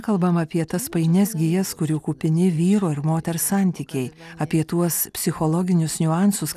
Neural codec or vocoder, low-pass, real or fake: none; 14.4 kHz; real